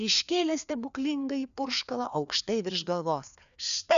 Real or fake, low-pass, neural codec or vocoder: fake; 7.2 kHz; codec, 16 kHz, 2 kbps, FreqCodec, larger model